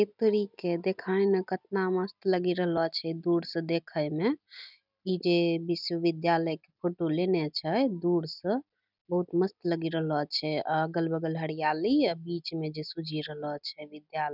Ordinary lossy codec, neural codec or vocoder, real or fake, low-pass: none; none; real; 5.4 kHz